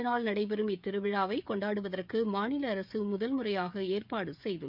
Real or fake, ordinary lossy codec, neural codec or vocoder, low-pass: fake; none; codec, 16 kHz, 16 kbps, FreqCodec, smaller model; 5.4 kHz